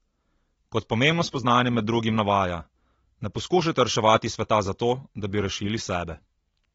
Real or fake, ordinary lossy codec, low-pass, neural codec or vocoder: real; AAC, 24 kbps; 10.8 kHz; none